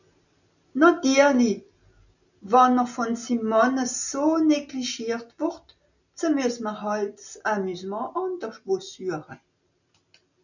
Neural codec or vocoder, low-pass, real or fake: none; 7.2 kHz; real